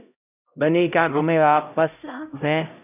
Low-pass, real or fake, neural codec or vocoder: 3.6 kHz; fake; codec, 16 kHz, 0.5 kbps, X-Codec, HuBERT features, trained on LibriSpeech